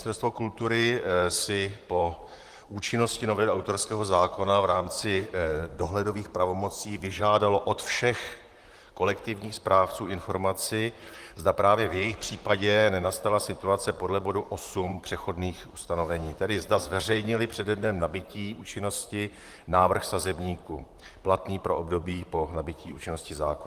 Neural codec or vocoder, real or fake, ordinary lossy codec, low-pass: vocoder, 44.1 kHz, 128 mel bands, Pupu-Vocoder; fake; Opus, 24 kbps; 14.4 kHz